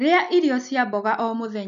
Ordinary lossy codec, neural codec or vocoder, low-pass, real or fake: none; none; 7.2 kHz; real